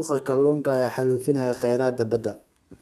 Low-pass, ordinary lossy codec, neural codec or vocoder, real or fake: 14.4 kHz; none; codec, 32 kHz, 1.9 kbps, SNAC; fake